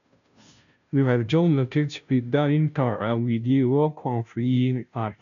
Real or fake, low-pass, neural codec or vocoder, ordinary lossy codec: fake; 7.2 kHz; codec, 16 kHz, 0.5 kbps, FunCodec, trained on Chinese and English, 25 frames a second; none